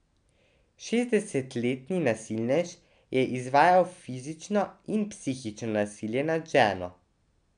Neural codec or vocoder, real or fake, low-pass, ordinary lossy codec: none; real; 9.9 kHz; none